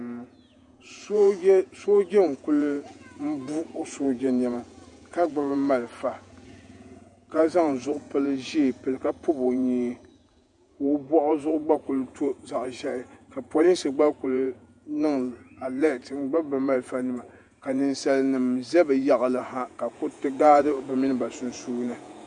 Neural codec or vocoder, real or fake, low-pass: vocoder, 48 kHz, 128 mel bands, Vocos; fake; 10.8 kHz